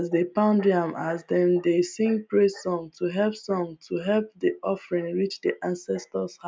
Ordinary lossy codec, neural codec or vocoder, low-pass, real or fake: none; none; none; real